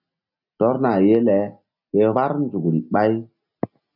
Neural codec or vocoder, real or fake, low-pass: none; real; 5.4 kHz